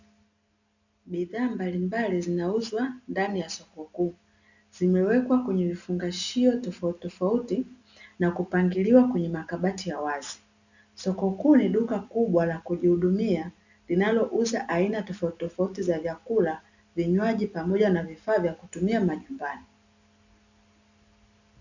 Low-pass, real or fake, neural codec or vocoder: 7.2 kHz; real; none